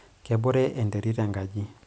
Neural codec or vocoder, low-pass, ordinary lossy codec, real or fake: none; none; none; real